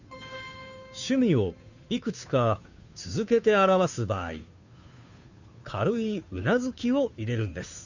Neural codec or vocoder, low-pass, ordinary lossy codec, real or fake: codec, 16 kHz, 2 kbps, FunCodec, trained on Chinese and English, 25 frames a second; 7.2 kHz; none; fake